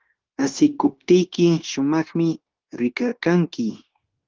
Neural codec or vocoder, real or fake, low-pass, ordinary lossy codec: codec, 16 kHz, 0.9 kbps, LongCat-Audio-Codec; fake; 7.2 kHz; Opus, 16 kbps